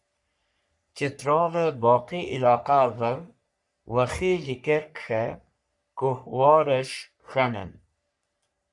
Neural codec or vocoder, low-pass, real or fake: codec, 44.1 kHz, 3.4 kbps, Pupu-Codec; 10.8 kHz; fake